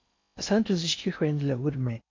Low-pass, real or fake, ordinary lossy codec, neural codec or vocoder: 7.2 kHz; fake; MP3, 48 kbps; codec, 16 kHz in and 24 kHz out, 0.6 kbps, FocalCodec, streaming, 4096 codes